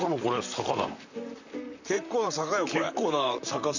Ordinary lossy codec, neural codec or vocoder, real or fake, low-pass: none; vocoder, 44.1 kHz, 128 mel bands, Pupu-Vocoder; fake; 7.2 kHz